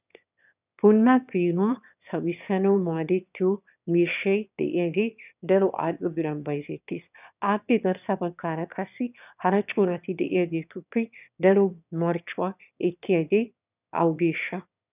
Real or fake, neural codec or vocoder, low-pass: fake; autoencoder, 22.05 kHz, a latent of 192 numbers a frame, VITS, trained on one speaker; 3.6 kHz